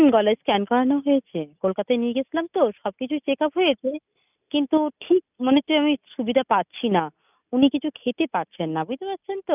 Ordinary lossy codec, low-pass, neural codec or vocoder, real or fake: none; 3.6 kHz; none; real